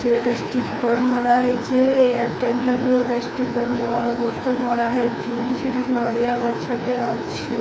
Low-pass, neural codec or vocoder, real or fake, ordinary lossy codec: none; codec, 16 kHz, 2 kbps, FreqCodec, larger model; fake; none